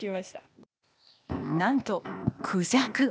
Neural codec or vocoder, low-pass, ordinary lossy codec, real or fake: codec, 16 kHz, 0.8 kbps, ZipCodec; none; none; fake